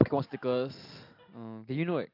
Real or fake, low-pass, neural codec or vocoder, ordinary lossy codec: real; 5.4 kHz; none; AAC, 32 kbps